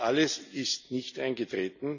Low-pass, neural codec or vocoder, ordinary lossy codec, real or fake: 7.2 kHz; none; none; real